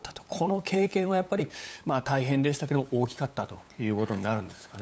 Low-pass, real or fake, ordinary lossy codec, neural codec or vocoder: none; fake; none; codec, 16 kHz, 8 kbps, FunCodec, trained on LibriTTS, 25 frames a second